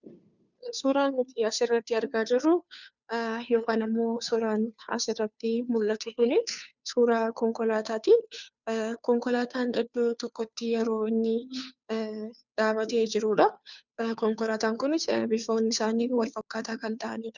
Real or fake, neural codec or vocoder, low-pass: fake; codec, 16 kHz, 2 kbps, FunCodec, trained on Chinese and English, 25 frames a second; 7.2 kHz